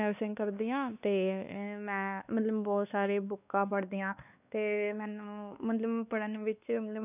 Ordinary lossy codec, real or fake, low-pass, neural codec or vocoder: none; fake; 3.6 kHz; codec, 16 kHz, 2 kbps, X-Codec, WavLM features, trained on Multilingual LibriSpeech